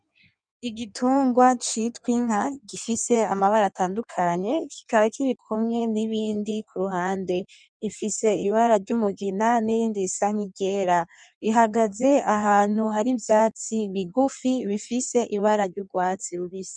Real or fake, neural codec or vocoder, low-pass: fake; codec, 16 kHz in and 24 kHz out, 1.1 kbps, FireRedTTS-2 codec; 9.9 kHz